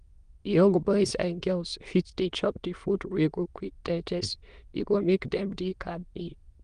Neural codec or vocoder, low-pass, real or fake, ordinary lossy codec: autoencoder, 22.05 kHz, a latent of 192 numbers a frame, VITS, trained on many speakers; 9.9 kHz; fake; Opus, 24 kbps